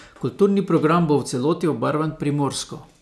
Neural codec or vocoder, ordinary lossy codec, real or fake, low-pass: none; none; real; none